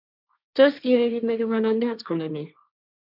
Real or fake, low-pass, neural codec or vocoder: fake; 5.4 kHz; codec, 16 kHz, 1.1 kbps, Voila-Tokenizer